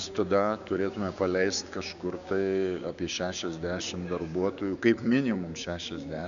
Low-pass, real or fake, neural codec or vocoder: 7.2 kHz; fake; codec, 16 kHz, 6 kbps, DAC